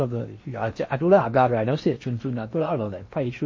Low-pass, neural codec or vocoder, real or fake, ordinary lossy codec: 7.2 kHz; codec, 16 kHz in and 24 kHz out, 0.8 kbps, FocalCodec, streaming, 65536 codes; fake; MP3, 32 kbps